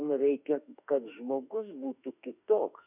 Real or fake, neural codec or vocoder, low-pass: fake; autoencoder, 48 kHz, 32 numbers a frame, DAC-VAE, trained on Japanese speech; 3.6 kHz